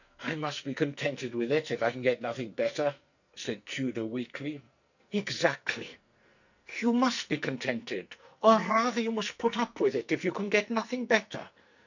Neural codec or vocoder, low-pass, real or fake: codec, 44.1 kHz, 2.6 kbps, SNAC; 7.2 kHz; fake